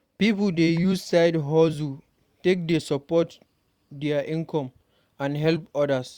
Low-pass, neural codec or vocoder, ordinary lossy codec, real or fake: 19.8 kHz; vocoder, 44.1 kHz, 128 mel bands every 256 samples, BigVGAN v2; Opus, 64 kbps; fake